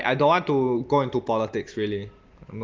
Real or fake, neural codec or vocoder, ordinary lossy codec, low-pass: real; none; Opus, 32 kbps; 7.2 kHz